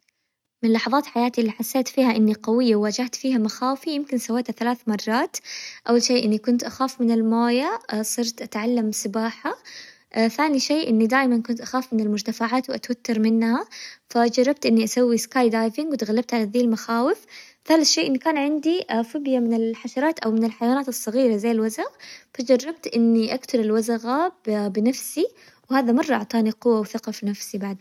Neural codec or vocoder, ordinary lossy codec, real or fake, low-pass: none; none; real; 19.8 kHz